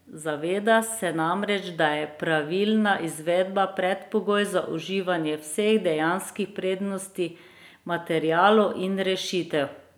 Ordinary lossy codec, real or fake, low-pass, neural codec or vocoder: none; real; none; none